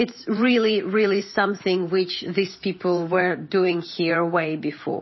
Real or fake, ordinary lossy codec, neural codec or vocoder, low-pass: fake; MP3, 24 kbps; vocoder, 44.1 kHz, 128 mel bands every 512 samples, BigVGAN v2; 7.2 kHz